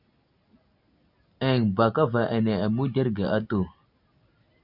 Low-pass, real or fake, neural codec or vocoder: 5.4 kHz; real; none